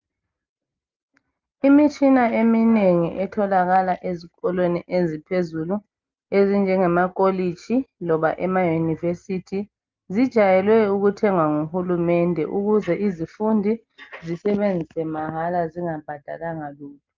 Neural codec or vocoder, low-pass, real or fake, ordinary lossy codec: none; 7.2 kHz; real; Opus, 24 kbps